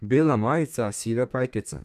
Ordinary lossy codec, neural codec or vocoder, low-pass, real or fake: none; codec, 32 kHz, 1.9 kbps, SNAC; 14.4 kHz; fake